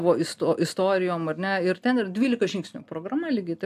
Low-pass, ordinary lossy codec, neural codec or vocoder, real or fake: 14.4 kHz; AAC, 96 kbps; none; real